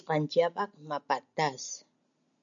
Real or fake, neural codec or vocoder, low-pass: real; none; 7.2 kHz